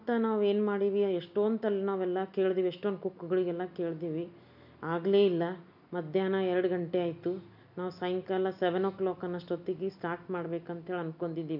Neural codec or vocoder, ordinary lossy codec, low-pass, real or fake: none; none; 5.4 kHz; real